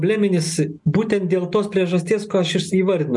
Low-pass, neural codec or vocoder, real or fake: 10.8 kHz; none; real